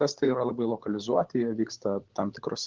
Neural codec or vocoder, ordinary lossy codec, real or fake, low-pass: codec, 16 kHz, 16 kbps, FunCodec, trained on LibriTTS, 50 frames a second; Opus, 16 kbps; fake; 7.2 kHz